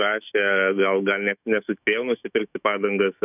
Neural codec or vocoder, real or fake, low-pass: autoencoder, 48 kHz, 128 numbers a frame, DAC-VAE, trained on Japanese speech; fake; 3.6 kHz